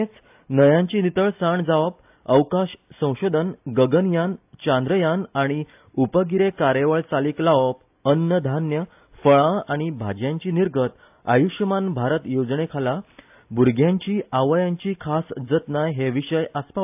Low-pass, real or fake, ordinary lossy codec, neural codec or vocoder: 3.6 kHz; real; AAC, 32 kbps; none